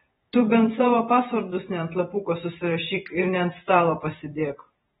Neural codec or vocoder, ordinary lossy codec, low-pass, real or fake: vocoder, 48 kHz, 128 mel bands, Vocos; AAC, 16 kbps; 19.8 kHz; fake